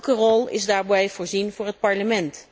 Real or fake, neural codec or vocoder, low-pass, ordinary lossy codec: real; none; none; none